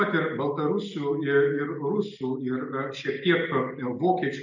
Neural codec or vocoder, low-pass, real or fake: none; 7.2 kHz; real